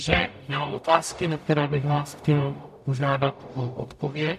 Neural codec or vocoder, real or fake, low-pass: codec, 44.1 kHz, 0.9 kbps, DAC; fake; 14.4 kHz